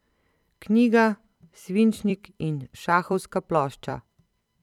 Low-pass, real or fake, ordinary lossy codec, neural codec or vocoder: 19.8 kHz; fake; none; vocoder, 44.1 kHz, 128 mel bands every 256 samples, BigVGAN v2